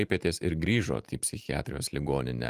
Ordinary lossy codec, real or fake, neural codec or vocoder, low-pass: Opus, 32 kbps; fake; vocoder, 44.1 kHz, 128 mel bands every 512 samples, BigVGAN v2; 14.4 kHz